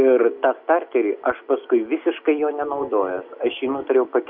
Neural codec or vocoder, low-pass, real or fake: none; 5.4 kHz; real